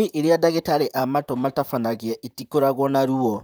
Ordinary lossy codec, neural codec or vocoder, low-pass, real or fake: none; vocoder, 44.1 kHz, 128 mel bands, Pupu-Vocoder; none; fake